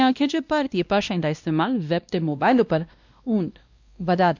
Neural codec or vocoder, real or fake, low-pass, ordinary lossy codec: codec, 16 kHz, 1 kbps, X-Codec, WavLM features, trained on Multilingual LibriSpeech; fake; 7.2 kHz; none